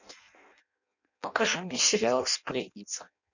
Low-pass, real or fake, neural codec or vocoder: 7.2 kHz; fake; codec, 16 kHz in and 24 kHz out, 0.6 kbps, FireRedTTS-2 codec